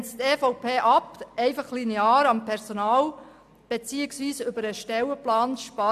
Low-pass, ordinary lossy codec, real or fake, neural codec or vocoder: 14.4 kHz; AAC, 96 kbps; real; none